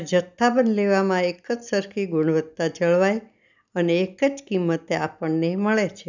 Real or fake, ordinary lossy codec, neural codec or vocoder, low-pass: real; none; none; 7.2 kHz